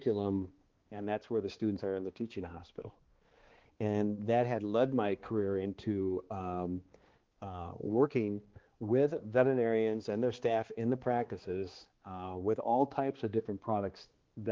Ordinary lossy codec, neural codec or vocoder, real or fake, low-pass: Opus, 16 kbps; codec, 16 kHz, 2 kbps, X-Codec, HuBERT features, trained on balanced general audio; fake; 7.2 kHz